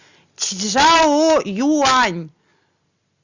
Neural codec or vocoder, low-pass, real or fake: none; 7.2 kHz; real